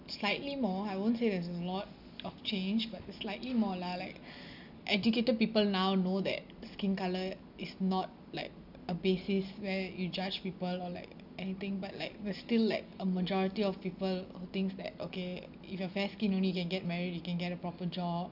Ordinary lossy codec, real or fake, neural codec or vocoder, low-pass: none; real; none; 5.4 kHz